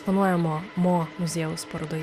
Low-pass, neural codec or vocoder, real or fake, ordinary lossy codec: 14.4 kHz; none; real; Opus, 64 kbps